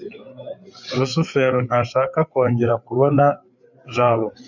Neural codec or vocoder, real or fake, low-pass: codec, 16 kHz, 8 kbps, FreqCodec, larger model; fake; 7.2 kHz